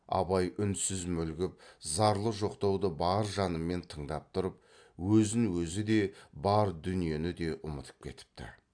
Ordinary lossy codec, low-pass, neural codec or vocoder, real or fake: AAC, 64 kbps; 9.9 kHz; none; real